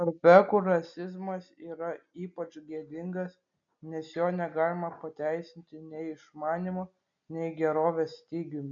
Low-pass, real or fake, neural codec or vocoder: 7.2 kHz; real; none